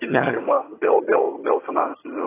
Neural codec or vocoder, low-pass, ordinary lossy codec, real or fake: vocoder, 22.05 kHz, 80 mel bands, HiFi-GAN; 3.6 kHz; AAC, 16 kbps; fake